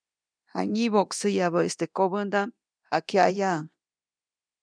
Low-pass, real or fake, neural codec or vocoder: 9.9 kHz; fake; codec, 24 kHz, 0.9 kbps, DualCodec